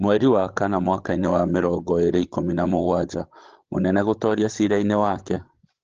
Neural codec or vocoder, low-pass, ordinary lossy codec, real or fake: codec, 16 kHz, 16 kbps, FreqCodec, larger model; 7.2 kHz; Opus, 16 kbps; fake